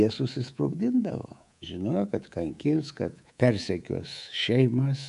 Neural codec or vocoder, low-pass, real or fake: codec, 24 kHz, 3.1 kbps, DualCodec; 10.8 kHz; fake